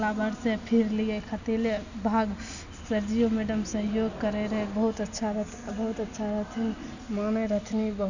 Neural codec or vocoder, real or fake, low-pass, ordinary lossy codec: none; real; 7.2 kHz; none